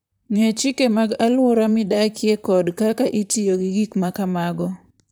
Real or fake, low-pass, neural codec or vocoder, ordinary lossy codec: fake; none; vocoder, 44.1 kHz, 128 mel bands, Pupu-Vocoder; none